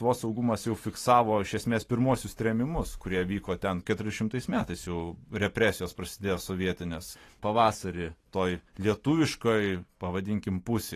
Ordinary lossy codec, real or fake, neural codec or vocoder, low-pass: AAC, 48 kbps; fake; vocoder, 44.1 kHz, 128 mel bands every 512 samples, BigVGAN v2; 14.4 kHz